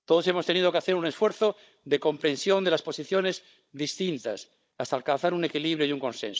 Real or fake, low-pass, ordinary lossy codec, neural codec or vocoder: fake; none; none; codec, 16 kHz, 16 kbps, FunCodec, trained on Chinese and English, 50 frames a second